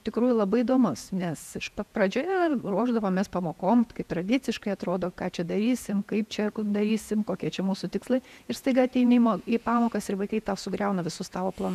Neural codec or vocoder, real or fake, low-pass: vocoder, 48 kHz, 128 mel bands, Vocos; fake; 14.4 kHz